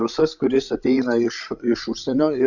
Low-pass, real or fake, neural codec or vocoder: 7.2 kHz; fake; codec, 16 kHz, 16 kbps, FreqCodec, larger model